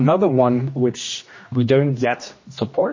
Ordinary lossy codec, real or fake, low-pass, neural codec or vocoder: MP3, 32 kbps; fake; 7.2 kHz; codec, 16 kHz, 1 kbps, X-Codec, HuBERT features, trained on general audio